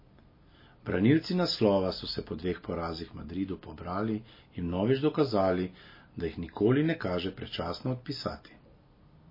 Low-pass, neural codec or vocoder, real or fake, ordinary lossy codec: 5.4 kHz; none; real; MP3, 24 kbps